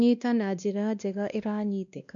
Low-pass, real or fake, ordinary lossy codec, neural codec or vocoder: 7.2 kHz; fake; none; codec, 16 kHz, 1 kbps, X-Codec, WavLM features, trained on Multilingual LibriSpeech